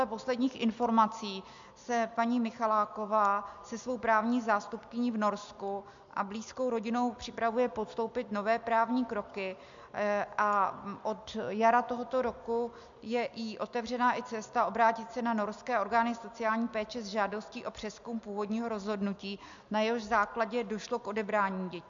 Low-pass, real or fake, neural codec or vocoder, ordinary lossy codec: 7.2 kHz; real; none; MP3, 64 kbps